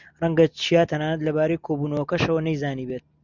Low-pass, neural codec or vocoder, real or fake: 7.2 kHz; none; real